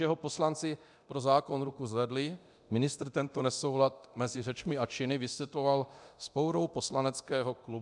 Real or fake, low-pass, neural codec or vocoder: fake; 10.8 kHz; codec, 24 kHz, 0.9 kbps, DualCodec